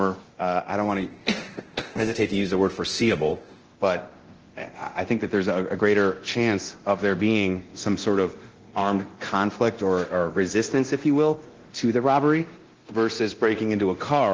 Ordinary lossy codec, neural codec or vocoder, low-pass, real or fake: Opus, 16 kbps; codec, 24 kHz, 0.9 kbps, DualCodec; 7.2 kHz; fake